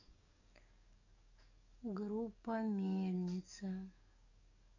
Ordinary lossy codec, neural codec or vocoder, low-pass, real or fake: none; codec, 44.1 kHz, 7.8 kbps, DAC; 7.2 kHz; fake